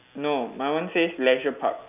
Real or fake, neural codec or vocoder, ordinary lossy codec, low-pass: real; none; none; 3.6 kHz